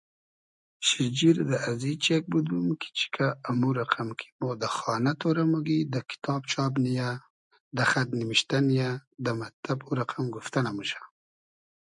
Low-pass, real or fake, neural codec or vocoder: 10.8 kHz; real; none